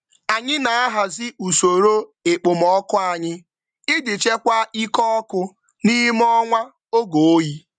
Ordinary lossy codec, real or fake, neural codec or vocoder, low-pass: none; real; none; 9.9 kHz